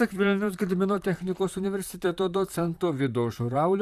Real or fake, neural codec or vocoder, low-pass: fake; vocoder, 44.1 kHz, 128 mel bands, Pupu-Vocoder; 14.4 kHz